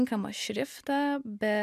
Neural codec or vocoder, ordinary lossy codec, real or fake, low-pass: none; MP3, 96 kbps; real; 14.4 kHz